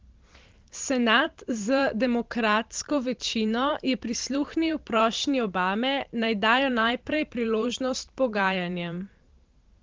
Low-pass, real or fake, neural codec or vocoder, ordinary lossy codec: 7.2 kHz; fake; vocoder, 44.1 kHz, 128 mel bands every 512 samples, BigVGAN v2; Opus, 16 kbps